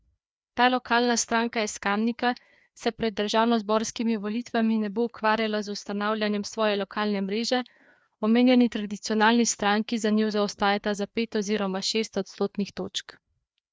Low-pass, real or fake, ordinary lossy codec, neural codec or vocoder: none; fake; none; codec, 16 kHz, 2 kbps, FreqCodec, larger model